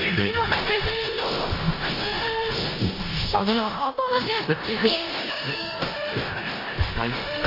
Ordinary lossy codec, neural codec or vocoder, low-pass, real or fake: AAC, 24 kbps; codec, 16 kHz in and 24 kHz out, 0.4 kbps, LongCat-Audio-Codec, four codebook decoder; 5.4 kHz; fake